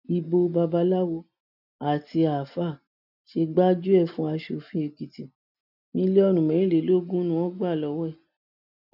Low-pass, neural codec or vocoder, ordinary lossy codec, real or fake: 5.4 kHz; none; none; real